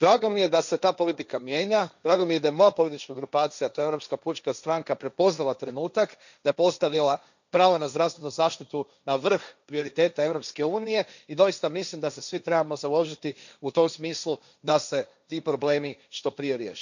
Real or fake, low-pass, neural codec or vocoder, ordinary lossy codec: fake; 7.2 kHz; codec, 16 kHz, 1.1 kbps, Voila-Tokenizer; none